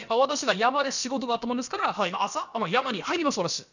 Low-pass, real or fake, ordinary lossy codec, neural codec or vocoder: 7.2 kHz; fake; none; codec, 16 kHz, about 1 kbps, DyCAST, with the encoder's durations